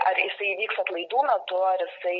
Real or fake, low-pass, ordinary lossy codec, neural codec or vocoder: real; 7.2 kHz; MP3, 32 kbps; none